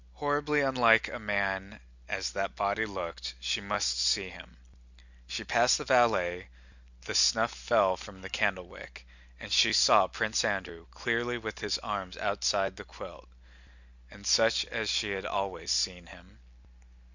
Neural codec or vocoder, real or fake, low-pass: none; real; 7.2 kHz